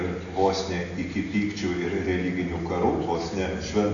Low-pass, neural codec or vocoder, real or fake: 7.2 kHz; none; real